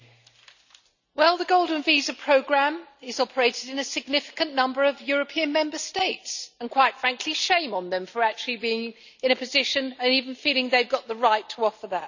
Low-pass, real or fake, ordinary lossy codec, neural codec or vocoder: 7.2 kHz; real; none; none